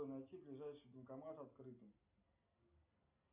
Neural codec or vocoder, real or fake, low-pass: none; real; 3.6 kHz